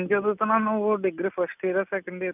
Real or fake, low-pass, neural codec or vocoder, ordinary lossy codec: real; 3.6 kHz; none; none